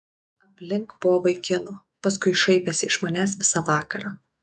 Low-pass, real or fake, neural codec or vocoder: 10.8 kHz; fake; autoencoder, 48 kHz, 128 numbers a frame, DAC-VAE, trained on Japanese speech